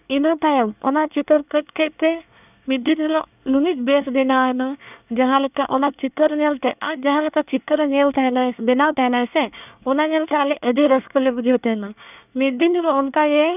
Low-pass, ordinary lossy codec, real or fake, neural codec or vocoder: 3.6 kHz; none; fake; codec, 24 kHz, 1 kbps, SNAC